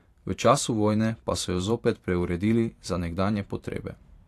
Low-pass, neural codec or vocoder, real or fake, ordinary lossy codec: 14.4 kHz; vocoder, 44.1 kHz, 128 mel bands every 512 samples, BigVGAN v2; fake; AAC, 64 kbps